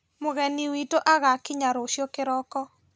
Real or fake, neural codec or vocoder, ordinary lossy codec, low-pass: real; none; none; none